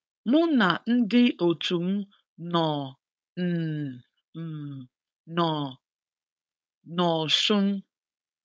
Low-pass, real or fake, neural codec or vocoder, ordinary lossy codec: none; fake; codec, 16 kHz, 4.8 kbps, FACodec; none